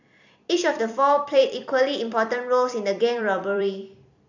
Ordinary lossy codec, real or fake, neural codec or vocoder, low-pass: none; fake; vocoder, 44.1 kHz, 128 mel bands every 256 samples, BigVGAN v2; 7.2 kHz